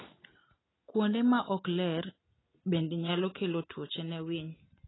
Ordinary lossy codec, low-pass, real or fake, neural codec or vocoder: AAC, 16 kbps; 7.2 kHz; real; none